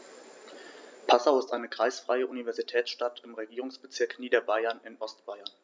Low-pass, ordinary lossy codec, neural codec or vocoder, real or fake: none; none; none; real